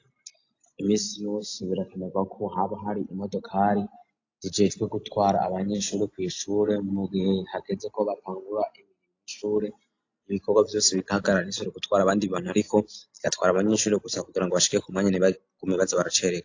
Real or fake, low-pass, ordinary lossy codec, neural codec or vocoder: real; 7.2 kHz; AAC, 48 kbps; none